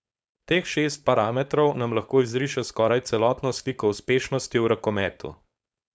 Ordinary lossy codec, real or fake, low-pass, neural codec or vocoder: none; fake; none; codec, 16 kHz, 4.8 kbps, FACodec